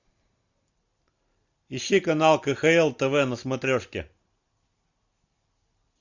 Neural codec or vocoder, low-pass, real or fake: none; 7.2 kHz; real